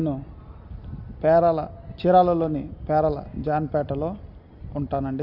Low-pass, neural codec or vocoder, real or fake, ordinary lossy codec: 5.4 kHz; none; real; none